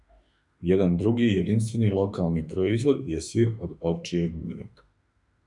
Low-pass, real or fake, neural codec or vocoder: 10.8 kHz; fake; autoencoder, 48 kHz, 32 numbers a frame, DAC-VAE, trained on Japanese speech